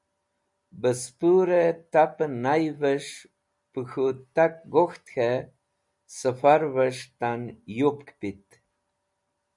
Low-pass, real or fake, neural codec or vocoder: 10.8 kHz; real; none